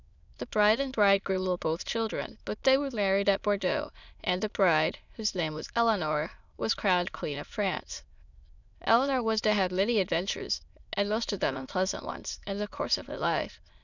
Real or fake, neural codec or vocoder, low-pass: fake; autoencoder, 22.05 kHz, a latent of 192 numbers a frame, VITS, trained on many speakers; 7.2 kHz